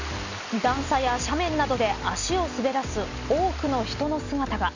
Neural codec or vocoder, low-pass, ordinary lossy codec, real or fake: none; 7.2 kHz; none; real